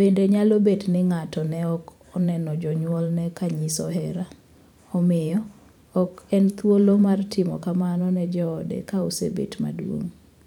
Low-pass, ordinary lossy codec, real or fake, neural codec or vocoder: 19.8 kHz; none; fake; vocoder, 44.1 kHz, 128 mel bands every 512 samples, BigVGAN v2